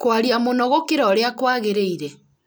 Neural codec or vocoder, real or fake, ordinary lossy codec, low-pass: none; real; none; none